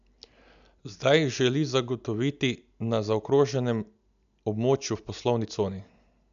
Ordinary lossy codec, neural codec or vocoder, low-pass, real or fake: none; none; 7.2 kHz; real